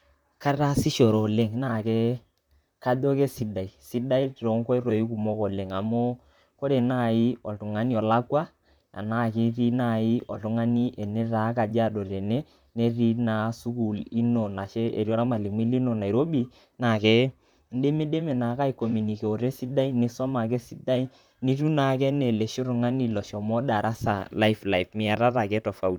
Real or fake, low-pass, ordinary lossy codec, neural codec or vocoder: fake; 19.8 kHz; none; vocoder, 44.1 kHz, 128 mel bands, Pupu-Vocoder